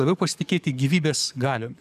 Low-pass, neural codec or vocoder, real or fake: 14.4 kHz; codec, 44.1 kHz, 7.8 kbps, DAC; fake